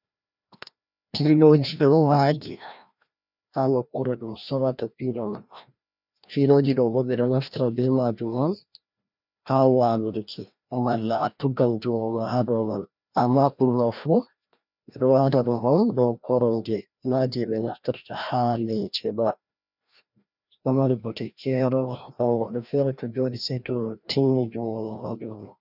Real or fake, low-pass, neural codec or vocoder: fake; 5.4 kHz; codec, 16 kHz, 1 kbps, FreqCodec, larger model